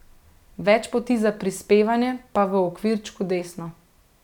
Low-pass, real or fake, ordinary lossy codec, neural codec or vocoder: 19.8 kHz; real; none; none